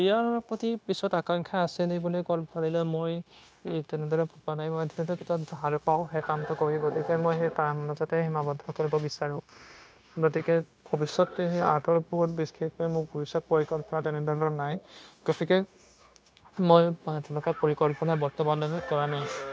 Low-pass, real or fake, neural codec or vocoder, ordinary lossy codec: none; fake; codec, 16 kHz, 0.9 kbps, LongCat-Audio-Codec; none